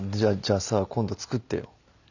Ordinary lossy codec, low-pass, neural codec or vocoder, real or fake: none; 7.2 kHz; none; real